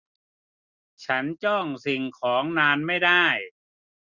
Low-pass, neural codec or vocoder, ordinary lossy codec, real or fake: 7.2 kHz; none; none; real